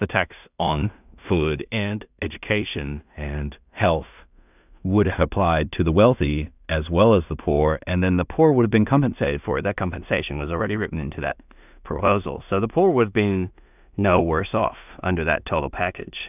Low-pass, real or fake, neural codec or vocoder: 3.6 kHz; fake; codec, 16 kHz in and 24 kHz out, 0.4 kbps, LongCat-Audio-Codec, two codebook decoder